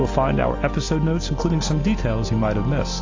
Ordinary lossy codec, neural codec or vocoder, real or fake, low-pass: AAC, 48 kbps; none; real; 7.2 kHz